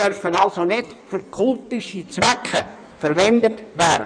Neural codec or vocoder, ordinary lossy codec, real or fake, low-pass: codec, 16 kHz in and 24 kHz out, 1.1 kbps, FireRedTTS-2 codec; none; fake; 9.9 kHz